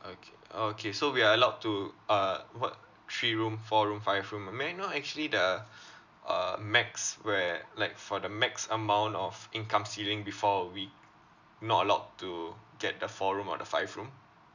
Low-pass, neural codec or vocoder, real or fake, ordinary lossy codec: 7.2 kHz; none; real; none